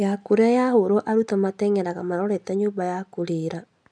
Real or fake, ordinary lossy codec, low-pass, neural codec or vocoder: real; none; 9.9 kHz; none